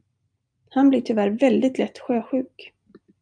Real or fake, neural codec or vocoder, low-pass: real; none; 9.9 kHz